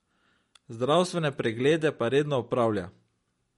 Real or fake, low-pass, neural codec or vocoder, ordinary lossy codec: real; 19.8 kHz; none; MP3, 48 kbps